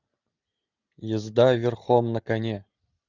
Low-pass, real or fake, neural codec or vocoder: 7.2 kHz; real; none